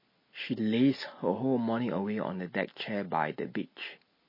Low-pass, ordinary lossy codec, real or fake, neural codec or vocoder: 5.4 kHz; MP3, 24 kbps; real; none